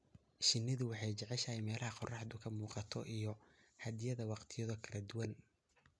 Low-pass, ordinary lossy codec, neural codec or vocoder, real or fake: none; none; none; real